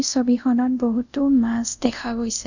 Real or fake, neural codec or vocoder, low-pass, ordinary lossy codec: fake; codec, 24 kHz, 0.5 kbps, DualCodec; 7.2 kHz; none